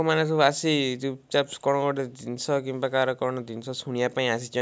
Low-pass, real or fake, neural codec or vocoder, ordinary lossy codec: none; real; none; none